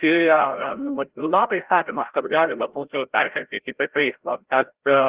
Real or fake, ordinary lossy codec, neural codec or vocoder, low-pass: fake; Opus, 16 kbps; codec, 16 kHz, 0.5 kbps, FreqCodec, larger model; 3.6 kHz